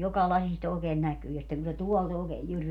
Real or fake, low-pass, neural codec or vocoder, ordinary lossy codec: real; 19.8 kHz; none; none